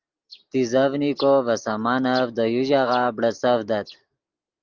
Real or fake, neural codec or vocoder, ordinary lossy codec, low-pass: real; none; Opus, 32 kbps; 7.2 kHz